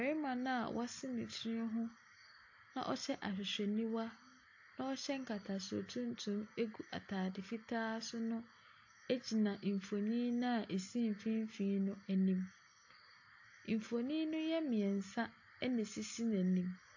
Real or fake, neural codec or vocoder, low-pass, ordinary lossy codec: real; none; 7.2 kHz; AAC, 48 kbps